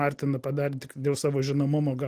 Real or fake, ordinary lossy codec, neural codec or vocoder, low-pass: real; Opus, 24 kbps; none; 14.4 kHz